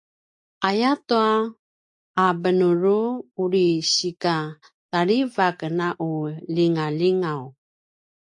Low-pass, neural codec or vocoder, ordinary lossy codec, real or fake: 10.8 kHz; none; AAC, 64 kbps; real